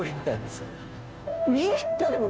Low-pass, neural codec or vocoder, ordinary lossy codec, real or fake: none; codec, 16 kHz, 0.5 kbps, FunCodec, trained on Chinese and English, 25 frames a second; none; fake